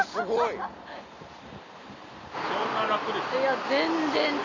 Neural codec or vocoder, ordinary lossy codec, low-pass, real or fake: none; MP3, 48 kbps; 7.2 kHz; real